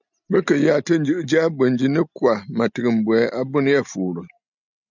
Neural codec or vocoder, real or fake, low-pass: none; real; 7.2 kHz